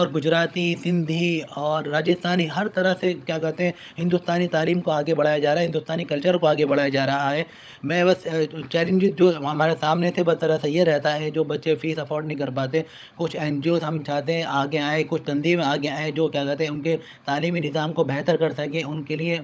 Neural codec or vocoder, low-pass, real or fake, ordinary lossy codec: codec, 16 kHz, 16 kbps, FunCodec, trained on LibriTTS, 50 frames a second; none; fake; none